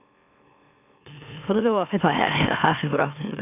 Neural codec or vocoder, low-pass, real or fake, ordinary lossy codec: autoencoder, 44.1 kHz, a latent of 192 numbers a frame, MeloTTS; 3.6 kHz; fake; none